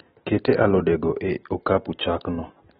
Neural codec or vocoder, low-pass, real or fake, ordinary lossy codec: none; 19.8 kHz; real; AAC, 16 kbps